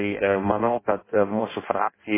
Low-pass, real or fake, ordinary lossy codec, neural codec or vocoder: 3.6 kHz; fake; MP3, 16 kbps; codec, 16 kHz in and 24 kHz out, 0.6 kbps, FireRedTTS-2 codec